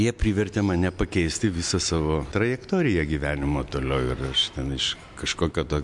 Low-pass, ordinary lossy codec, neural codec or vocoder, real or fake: 10.8 kHz; MP3, 64 kbps; none; real